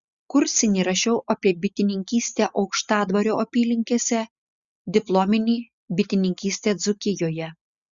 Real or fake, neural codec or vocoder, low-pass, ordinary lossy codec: real; none; 7.2 kHz; Opus, 64 kbps